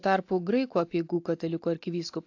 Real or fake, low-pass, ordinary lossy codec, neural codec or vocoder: real; 7.2 kHz; AAC, 48 kbps; none